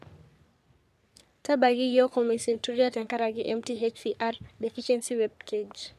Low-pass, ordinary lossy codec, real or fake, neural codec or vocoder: 14.4 kHz; none; fake; codec, 44.1 kHz, 3.4 kbps, Pupu-Codec